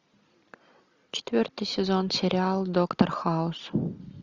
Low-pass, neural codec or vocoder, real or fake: 7.2 kHz; none; real